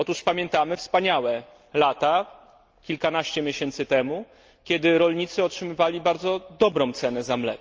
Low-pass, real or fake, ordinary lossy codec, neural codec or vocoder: 7.2 kHz; real; Opus, 24 kbps; none